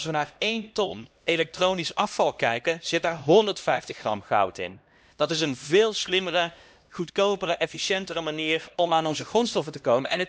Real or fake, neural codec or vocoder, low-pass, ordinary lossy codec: fake; codec, 16 kHz, 1 kbps, X-Codec, HuBERT features, trained on LibriSpeech; none; none